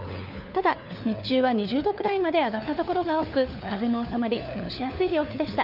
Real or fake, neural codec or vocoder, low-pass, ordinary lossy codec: fake; codec, 16 kHz, 4 kbps, FunCodec, trained on LibriTTS, 50 frames a second; 5.4 kHz; none